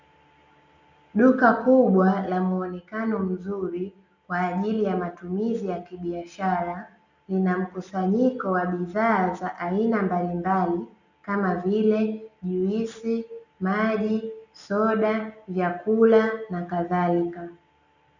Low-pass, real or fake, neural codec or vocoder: 7.2 kHz; real; none